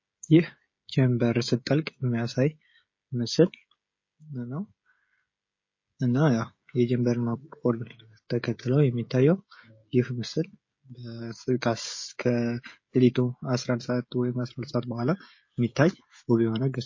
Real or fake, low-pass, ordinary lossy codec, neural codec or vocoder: fake; 7.2 kHz; MP3, 32 kbps; codec, 16 kHz, 16 kbps, FreqCodec, smaller model